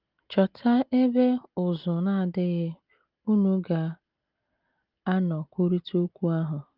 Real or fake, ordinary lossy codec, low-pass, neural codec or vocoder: real; Opus, 32 kbps; 5.4 kHz; none